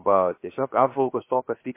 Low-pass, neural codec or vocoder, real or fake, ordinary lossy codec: 3.6 kHz; codec, 16 kHz, 0.7 kbps, FocalCodec; fake; MP3, 24 kbps